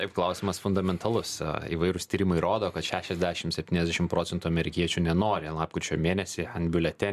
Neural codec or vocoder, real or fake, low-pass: none; real; 14.4 kHz